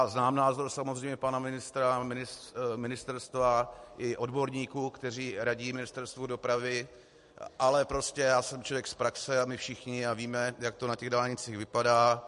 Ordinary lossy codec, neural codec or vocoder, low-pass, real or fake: MP3, 48 kbps; none; 14.4 kHz; real